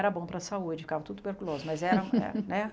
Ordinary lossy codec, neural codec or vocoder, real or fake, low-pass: none; none; real; none